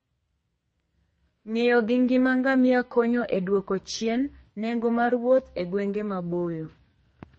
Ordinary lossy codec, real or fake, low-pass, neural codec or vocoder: MP3, 32 kbps; fake; 10.8 kHz; codec, 44.1 kHz, 2.6 kbps, SNAC